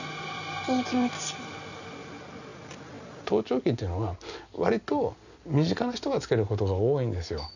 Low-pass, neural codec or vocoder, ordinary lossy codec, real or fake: 7.2 kHz; none; none; real